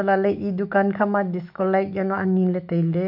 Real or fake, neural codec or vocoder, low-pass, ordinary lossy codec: fake; autoencoder, 48 kHz, 128 numbers a frame, DAC-VAE, trained on Japanese speech; 5.4 kHz; MP3, 48 kbps